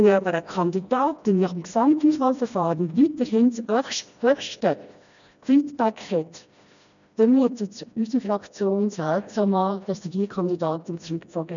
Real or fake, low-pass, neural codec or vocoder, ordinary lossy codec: fake; 7.2 kHz; codec, 16 kHz, 1 kbps, FreqCodec, smaller model; none